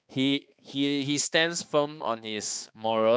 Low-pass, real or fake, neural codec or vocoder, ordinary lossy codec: none; fake; codec, 16 kHz, 2 kbps, X-Codec, HuBERT features, trained on balanced general audio; none